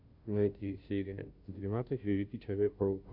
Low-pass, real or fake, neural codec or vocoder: 5.4 kHz; fake; codec, 16 kHz, 0.5 kbps, FunCodec, trained on Chinese and English, 25 frames a second